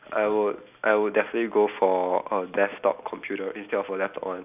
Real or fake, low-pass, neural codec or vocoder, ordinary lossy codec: real; 3.6 kHz; none; none